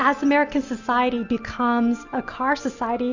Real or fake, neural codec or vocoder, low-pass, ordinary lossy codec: real; none; 7.2 kHz; Opus, 64 kbps